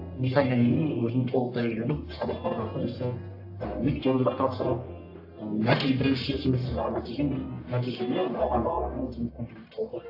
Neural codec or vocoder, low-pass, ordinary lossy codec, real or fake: codec, 44.1 kHz, 1.7 kbps, Pupu-Codec; 5.4 kHz; AAC, 32 kbps; fake